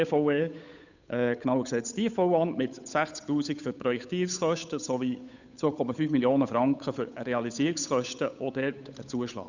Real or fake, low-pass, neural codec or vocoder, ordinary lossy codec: fake; 7.2 kHz; codec, 16 kHz, 8 kbps, FunCodec, trained on Chinese and English, 25 frames a second; none